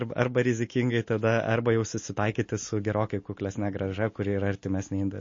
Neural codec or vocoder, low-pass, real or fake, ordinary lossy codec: none; 7.2 kHz; real; MP3, 32 kbps